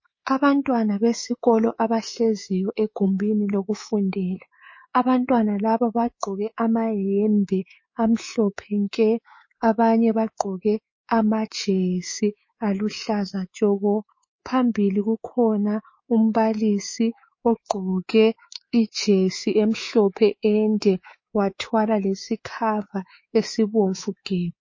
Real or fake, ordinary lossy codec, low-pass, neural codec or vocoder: fake; MP3, 32 kbps; 7.2 kHz; codec, 24 kHz, 3.1 kbps, DualCodec